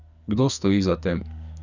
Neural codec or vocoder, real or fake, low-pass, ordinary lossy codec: codec, 44.1 kHz, 2.6 kbps, SNAC; fake; 7.2 kHz; none